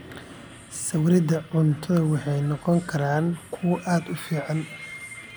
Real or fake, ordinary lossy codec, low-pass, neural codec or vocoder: real; none; none; none